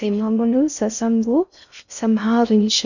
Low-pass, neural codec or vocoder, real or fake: 7.2 kHz; codec, 16 kHz in and 24 kHz out, 0.6 kbps, FocalCodec, streaming, 4096 codes; fake